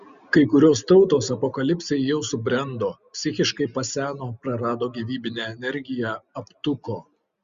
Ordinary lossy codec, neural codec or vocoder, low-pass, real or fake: Opus, 64 kbps; none; 7.2 kHz; real